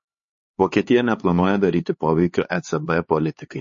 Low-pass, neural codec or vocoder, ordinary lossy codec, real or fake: 7.2 kHz; codec, 16 kHz, 4 kbps, X-Codec, HuBERT features, trained on LibriSpeech; MP3, 32 kbps; fake